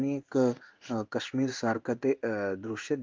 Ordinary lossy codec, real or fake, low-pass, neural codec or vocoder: Opus, 32 kbps; fake; 7.2 kHz; codec, 16 kHz in and 24 kHz out, 1 kbps, XY-Tokenizer